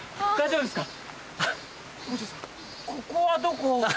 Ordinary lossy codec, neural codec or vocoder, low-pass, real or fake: none; none; none; real